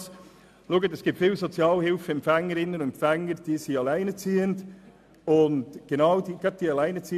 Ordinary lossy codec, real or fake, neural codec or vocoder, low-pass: MP3, 96 kbps; real; none; 14.4 kHz